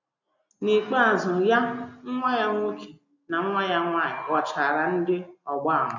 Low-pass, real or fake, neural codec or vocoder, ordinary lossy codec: 7.2 kHz; real; none; none